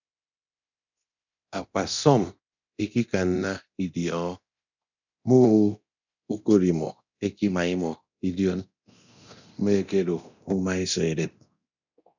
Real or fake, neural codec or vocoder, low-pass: fake; codec, 24 kHz, 0.9 kbps, DualCodec; 7.2 kHz